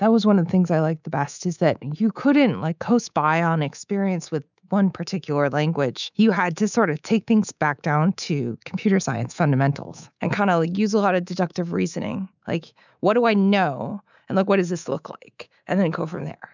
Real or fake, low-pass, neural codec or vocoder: fake; 7.2 kHz; codec, 24 kHz, 3.1 kbps, DualCodec